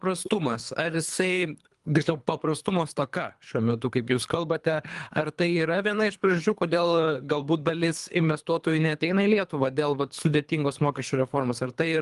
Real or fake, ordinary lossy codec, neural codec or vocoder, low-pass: fake; Opus, 32 kbps; codec, 24 kHz, 3 kbps, HILCodec; 10.8 kHz